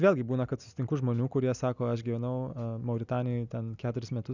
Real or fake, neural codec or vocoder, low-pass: real; none; 7.2 kHz